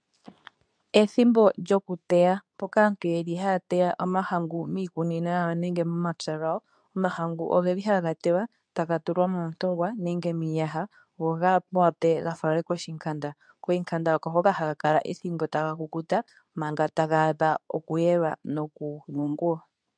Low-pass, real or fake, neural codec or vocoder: 9.9 kHz; fake; codec, 24 kHz, 0.9 kbps, WavTokenizer, medium speech release version 2